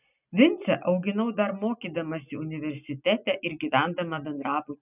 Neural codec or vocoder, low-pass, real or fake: none; 3.6 kHz; real